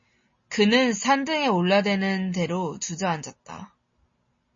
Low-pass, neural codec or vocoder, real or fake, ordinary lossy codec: 7.2 kHz; none; real; MP3, 32 kbps